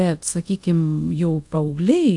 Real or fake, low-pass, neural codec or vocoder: fake; 10.8 kHz; codec, 24 kHz, 0.5 kbps, DualCodec